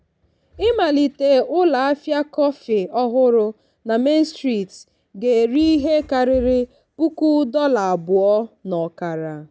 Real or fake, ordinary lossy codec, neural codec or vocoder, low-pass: real; none; none; none